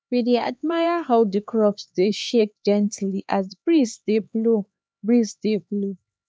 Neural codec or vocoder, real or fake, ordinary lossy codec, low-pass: codec, 16 kHz, 4 kbps, X-Codec, HuBERT features, trained on LibriSpeech; fake; none; none